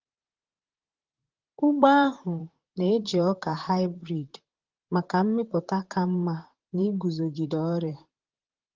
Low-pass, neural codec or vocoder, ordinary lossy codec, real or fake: 7.2 kHz; vocoder, 44.1 kHz, 128 mel bands, Pupu-Vocoder; Opus, 16 kbps; fake